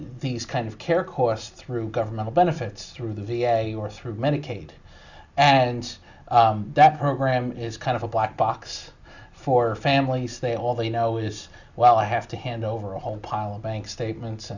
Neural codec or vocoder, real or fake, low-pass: none; real; 7.2 kHz